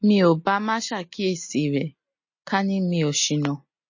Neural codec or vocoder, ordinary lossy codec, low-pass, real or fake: none; MP3, 32 kbps; 7.2 kHz; real